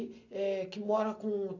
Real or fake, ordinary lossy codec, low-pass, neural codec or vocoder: real; none; 7.2 kHz; none